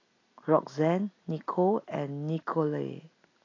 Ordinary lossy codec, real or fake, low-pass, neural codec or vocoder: AAC, 32 kbps; real; 7.2 kHz; none